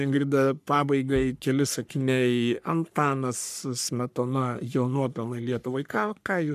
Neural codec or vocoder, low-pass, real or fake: codec, 44.1 kHz, 3.4 kbps, Pupu-Codec; 14.4 kHz; fake